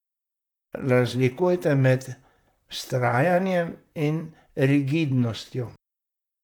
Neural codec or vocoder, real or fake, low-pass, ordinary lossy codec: vocoder, 44.1 kHz, 128 mel bands, Pupu-Vocoder; fake; 19.8 kHz; none